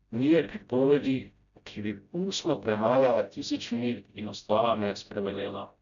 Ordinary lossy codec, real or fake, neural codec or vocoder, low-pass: none; fake; codec, 16 kHz, 0.5 kbps, FreqCodec, smaller model; 7.2 kHz